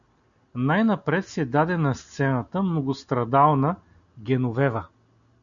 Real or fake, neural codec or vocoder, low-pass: real; none; 7.2 kHz